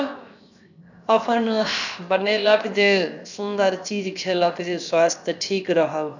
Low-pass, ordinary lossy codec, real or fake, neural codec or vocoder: 7.2 kHz; none; fake; codec, 16 kHz, 0.7 kbps, FocalCodec